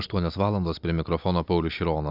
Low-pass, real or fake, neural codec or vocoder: 5.4 kHz; real; none